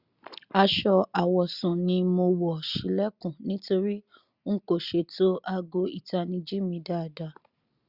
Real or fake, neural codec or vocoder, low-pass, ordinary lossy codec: real; none; 5.4 kHz; Opus, 64 kbps